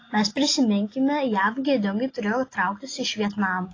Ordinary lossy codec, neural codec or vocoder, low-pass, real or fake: AAC, 32 kbps; none; 7.2 kHz; real